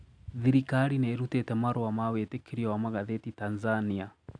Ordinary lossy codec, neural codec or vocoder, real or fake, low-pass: none; none; real; 9.9 kHz